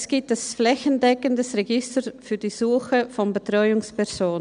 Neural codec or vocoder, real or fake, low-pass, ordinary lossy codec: none; real; 9.9 kHz; none